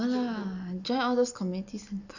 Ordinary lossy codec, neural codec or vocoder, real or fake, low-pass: none; none; real; 7.2 kHz